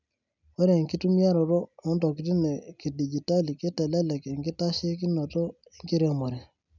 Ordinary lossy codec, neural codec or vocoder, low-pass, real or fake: none; none; 7.2 kHz; real